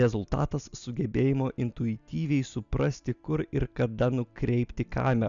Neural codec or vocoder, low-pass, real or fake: none; 7.2 kHz; real